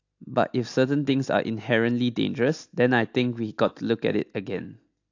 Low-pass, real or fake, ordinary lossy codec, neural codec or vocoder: 7.2 kHz; real; AAC, 48 kbps; none